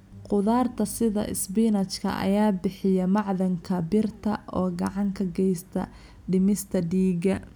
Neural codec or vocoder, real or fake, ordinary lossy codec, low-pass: none; real; none; 19.8 kHz